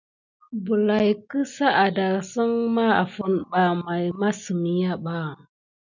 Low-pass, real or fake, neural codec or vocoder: 7.2 kHz; real; none